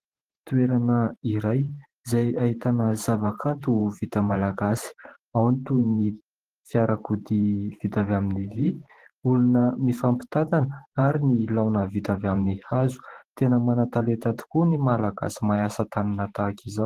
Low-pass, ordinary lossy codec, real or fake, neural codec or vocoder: 14.4 kHz; Opus, 16 kbps; fake; vocoder, 44.1 kHz, 128 mel bands every 512 samples, BigVGAN v2